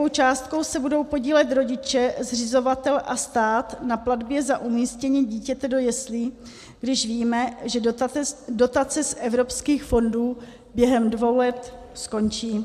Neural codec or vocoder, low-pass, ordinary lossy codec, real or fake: none; 14.4 kHz; MP3, 96 kbps; real